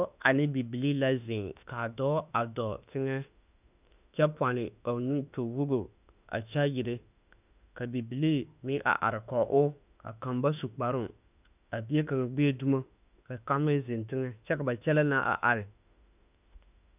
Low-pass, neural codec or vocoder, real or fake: 3.6 kHz; autoencoder, 48 kHz, 32 numbers a frame, DAC-VAE, trained on Japanese speech; fake